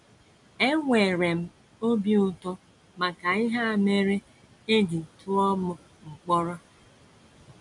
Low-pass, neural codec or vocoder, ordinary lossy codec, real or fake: 10.8 kHz; none; none; real